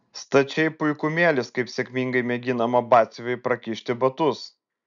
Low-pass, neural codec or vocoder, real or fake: 7.2 kHz; none; real